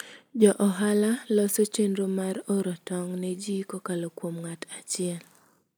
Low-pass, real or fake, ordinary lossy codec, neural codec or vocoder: none; real; none; none